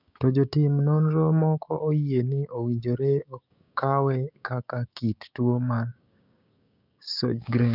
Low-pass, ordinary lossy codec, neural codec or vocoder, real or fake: 5.4 kHz; none; codec, 16 kHz, 6 kbps, DAC; fake